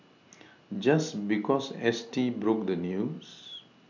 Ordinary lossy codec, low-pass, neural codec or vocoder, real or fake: none; 7.2 kHz; none; real